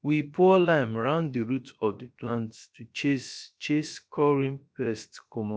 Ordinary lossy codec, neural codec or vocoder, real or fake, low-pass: none; codec, 16 kHz, about 1 kbps, DyCAST, with the encoder's durations; fake; none